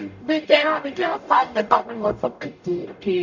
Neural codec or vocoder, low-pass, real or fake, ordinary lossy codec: codec, 44.1 kHz, 0.9 kbps, DAC; 7.2 kHz; fake; none